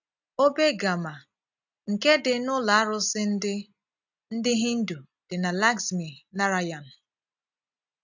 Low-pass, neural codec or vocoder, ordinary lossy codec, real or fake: 7.2 kHz; none; none; real